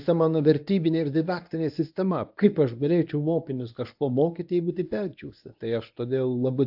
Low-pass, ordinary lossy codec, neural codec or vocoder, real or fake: 5.4 kHz; AAC, 48 kbps; codec, 24 kHz, 0.9 kbps, WavTokenizer, medium speech release version 1; fake